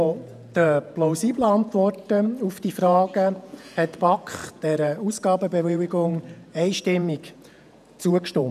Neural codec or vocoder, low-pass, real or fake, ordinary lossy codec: vocoder, 48 kHz, 128 mel bands, Vocos; 14.4 kHz; fake; none